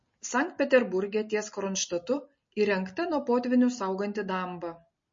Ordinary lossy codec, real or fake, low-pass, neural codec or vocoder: MP3, 32 kbps; real; 7.2 kHz; none